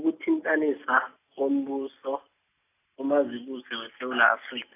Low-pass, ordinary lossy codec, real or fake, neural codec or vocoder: 3.6 kHz; AAC, 24 kbps; real; none